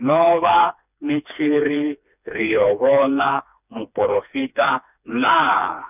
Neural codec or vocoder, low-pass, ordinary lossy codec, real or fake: codec, 16 kHz, 2 kbps, FreqCodec, smaller model; 3.6 kHz; none; fake